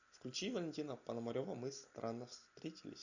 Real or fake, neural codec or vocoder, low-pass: real; none; 7.2 kHz